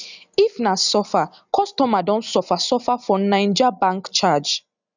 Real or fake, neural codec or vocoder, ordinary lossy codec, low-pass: real; none; none; 7.2 kHz